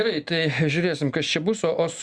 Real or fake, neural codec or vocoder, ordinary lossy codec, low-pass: real; none; Opus, 64 kbps; 9.9 kHz